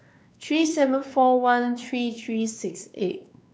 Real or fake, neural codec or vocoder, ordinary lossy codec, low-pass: fake; codec, 16 kHz, 2 kbps, X-Codec, WavLM features, trained on Multilingual LibriSpeech; none; none